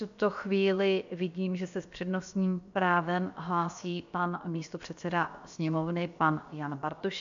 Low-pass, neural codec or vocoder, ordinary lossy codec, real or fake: 7.2 kHz; codec, 16 kHz, about 1 kbps, DyCAST, with the encoder's durations; AAC, 64 kbps; fake